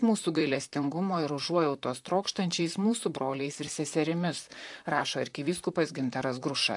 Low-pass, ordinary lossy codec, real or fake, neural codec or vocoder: 10.8 kHz; AAC, 64 kbps; fake; vocoder, 44.1 kHz, 128 mel bands, Pupu-Vocoder